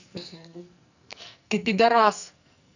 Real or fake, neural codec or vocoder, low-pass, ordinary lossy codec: fake; codec, 44.1 kHz, 2.6 kbps, SNAC; 7.2 kHz; none